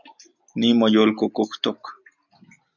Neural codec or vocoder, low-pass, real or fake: none; 7.2 kHz; real